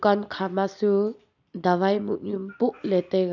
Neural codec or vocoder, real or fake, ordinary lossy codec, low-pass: none; real; none; 7.2 kHz